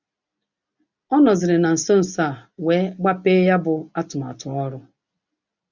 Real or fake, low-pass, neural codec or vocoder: real; 7.2 kHz; none